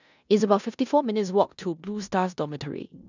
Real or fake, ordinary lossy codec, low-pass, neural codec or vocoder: fake; none; 7.2 kHz; codec, 16 kHz in and 24 kHz out, 0.9 kbps, LongCat-Audio-Codec, four codebook decoder